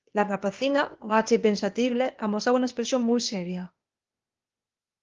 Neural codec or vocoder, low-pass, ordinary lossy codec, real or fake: codec, 16 kHz, 0.8 kbps, ZipCodec; 7.2 kHz; Opus, 32 kbps; fake